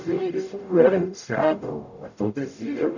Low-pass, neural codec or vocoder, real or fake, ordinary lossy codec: 7.2 kHz; codec, 44.1 kHz, 0.9 kbps, DAC; fake; none